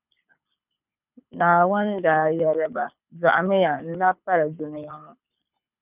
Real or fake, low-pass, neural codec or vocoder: fake; 3.6 kHz; codec, 24 kHz, 6 kbps, HILCodec